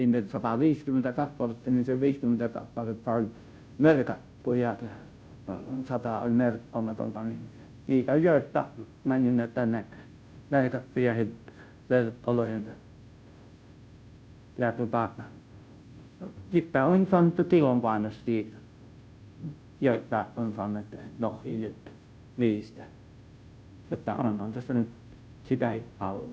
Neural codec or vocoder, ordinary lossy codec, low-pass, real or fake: codec, 16 kHz, 0.5 kbps, FunCodec, trained on Chinese and English, 25 frames a second; none; none; fake